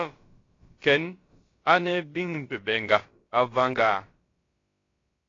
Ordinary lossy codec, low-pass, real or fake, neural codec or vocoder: AAC, 32 kbps; 7.2 kHz; fake; codec, 16 kHz, about 1 kbps, DyCAST, with the encoder's durations